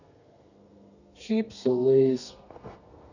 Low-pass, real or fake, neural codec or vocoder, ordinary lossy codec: 7.2 kHz; fake; codec, 32 kHz, 1.9 kbps, SNAC; none